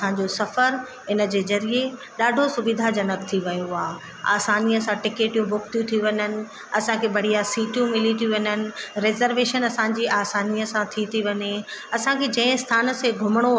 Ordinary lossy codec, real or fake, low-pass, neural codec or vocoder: none; real; none; none